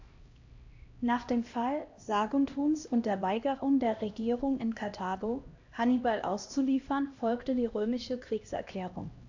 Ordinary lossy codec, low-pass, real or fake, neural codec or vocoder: AAC, 48 kbps; 7.2 kHz; fake; codec, 16 kHz, 1 kbps, X-Codec, HuBERT features, trained on LibriSpeech